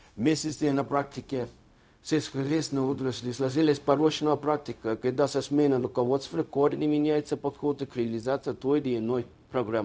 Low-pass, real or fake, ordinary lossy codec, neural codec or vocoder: none; fake; none; codec, 16 kHz, 0.4 kbps, LongCat-Audio-Codec